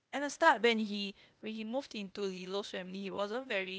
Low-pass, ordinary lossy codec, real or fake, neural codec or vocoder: none; none; fake; codec, 16 kHz, 0.8 kbps, ZipCodec